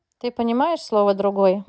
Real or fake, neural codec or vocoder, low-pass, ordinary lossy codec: real; none; none; none